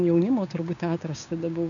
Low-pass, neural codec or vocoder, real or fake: 7.2 kHz; codec, 16 kHz, 6 kbps, DAC; fake